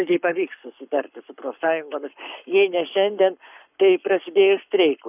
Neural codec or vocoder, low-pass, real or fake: codec, 44.1 kHz, 7.8 kbps, Pupu-Codec; 3.6 kHz; fake